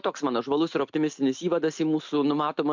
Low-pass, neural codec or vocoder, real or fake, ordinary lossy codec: 7.2 kHz; none; real; MP3, 64 kbps